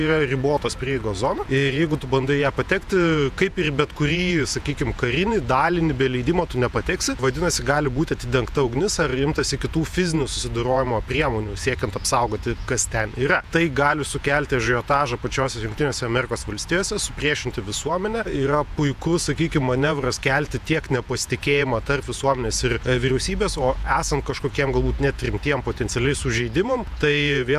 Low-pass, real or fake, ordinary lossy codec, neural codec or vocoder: 14.4 kHz; fake; AAC, 96 kbps; vocoder, 48 kHz, 128 mel bands, Vocos